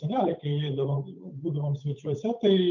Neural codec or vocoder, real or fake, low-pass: codec, 16 kHz, 8 kbps, FunCodec, trained on Chinese and English, 25 frames a second; fake; 7.2 kHz